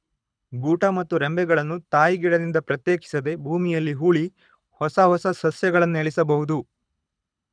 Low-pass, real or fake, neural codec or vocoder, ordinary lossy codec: 9.9 kHz; fake; codec, 24 kHz, 6 kbps, HILCodec; none